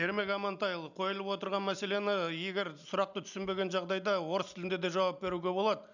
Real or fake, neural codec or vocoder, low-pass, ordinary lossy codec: real; none; 7.2 kHz; none